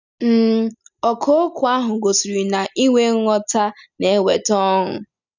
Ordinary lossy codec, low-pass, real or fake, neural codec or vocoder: none; 7.2 kHz; real; none